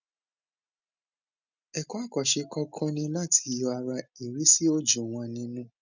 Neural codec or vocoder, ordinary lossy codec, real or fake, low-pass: none; none; real; 7.2 kHz